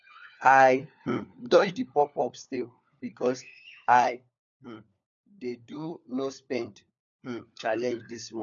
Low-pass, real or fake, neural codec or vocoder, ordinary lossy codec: 7.2 kHz; fake; codec, 16 kHz, 4 kbps, FunCodec, trained on LibriTTS, 50 frames a second; none